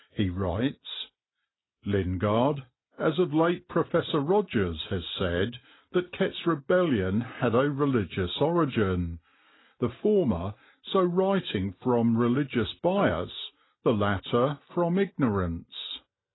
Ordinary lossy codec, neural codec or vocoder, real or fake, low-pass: AAC, 16 kbps; none; real; 7.2 kHz